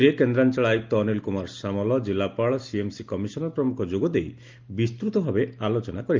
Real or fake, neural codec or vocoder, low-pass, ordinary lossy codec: real; none; 7.2 kHz; Opus, 32 kbps